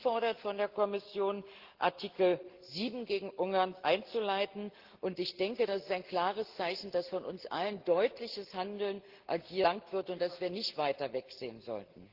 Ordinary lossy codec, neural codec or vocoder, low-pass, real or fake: Opus, 16 kbps; none; 5.4 kHz; real